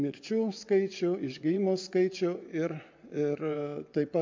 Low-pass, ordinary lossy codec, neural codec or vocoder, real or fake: 7.2 kHz; MP3, 64 kbps; vocoder, 24 kHz, 100 mel bands, Vocos; fake